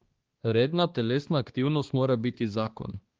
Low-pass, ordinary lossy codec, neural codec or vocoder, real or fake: 7.2 kHz; Opus, 16 kbps; codec, 16 kHz, 2 kbps, X-Codec, HuBERT features, trained on balanced general audio; fake